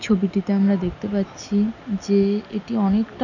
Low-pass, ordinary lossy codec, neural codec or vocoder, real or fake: 7.2 kHz; none; none; real